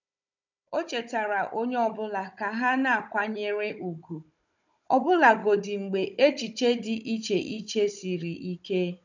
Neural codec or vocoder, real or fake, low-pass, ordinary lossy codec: codec, 16 kHz, 16 kbps, FunCodec, trained on Chinese and English, 50 frames a second; fake; 7.2 kHz; none